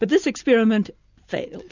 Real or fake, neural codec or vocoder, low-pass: real; none; 7.2 kHz